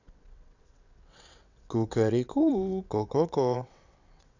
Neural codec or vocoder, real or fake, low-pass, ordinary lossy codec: vocoder, 22.05 kHz, 80 mel bands, WaveNeXt; fake; 7.2 kHz; none